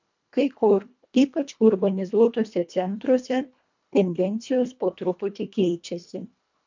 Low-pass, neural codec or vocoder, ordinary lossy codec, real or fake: 7.2 kHz; codec, 24 kHz, 1.5 kbps, HILCodec; MP3, 64 kbps; fake